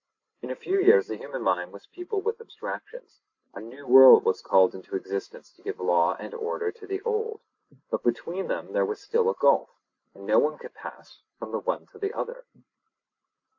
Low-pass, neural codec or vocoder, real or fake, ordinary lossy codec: 7.2 kHz; none; real; AAC, 48 kbps